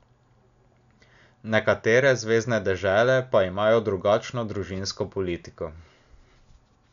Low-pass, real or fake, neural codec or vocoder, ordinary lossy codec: 7.2 kHz; real; none; none